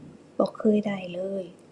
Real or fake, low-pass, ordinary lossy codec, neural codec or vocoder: real; 10.8 kHz; Opus, 64 kbps; none